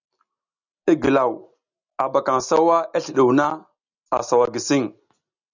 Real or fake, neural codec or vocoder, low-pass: real; none; 7.2 kHz